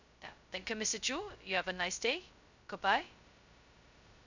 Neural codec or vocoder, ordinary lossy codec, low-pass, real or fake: codec, 16 kHz, 0.2 kbps, FocalCodec; none; 7.2 kHz; fake